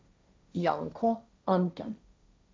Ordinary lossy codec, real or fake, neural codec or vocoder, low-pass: none; fake; codec, 16 kHz, 1.1 kbps, Voila-Tokenizer; none